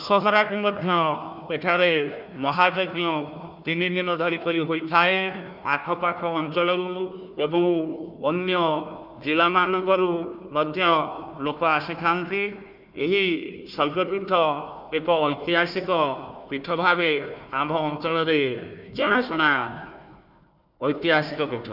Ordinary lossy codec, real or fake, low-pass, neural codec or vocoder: none; fake; 5.4 kHz; codec, 16 kHz, 1 kbps, FunCodec, trained on Chinese and English, 50 frames a second